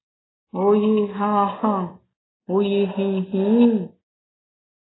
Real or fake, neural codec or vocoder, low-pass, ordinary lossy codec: real; none; 7.2 kHz; AAC, 16 kbps